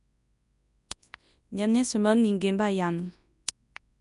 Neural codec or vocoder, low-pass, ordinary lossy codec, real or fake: codec, 24 kHz, 0.9 kbps, WavTokenizer, large speech release; 10.8 kHz; none; fake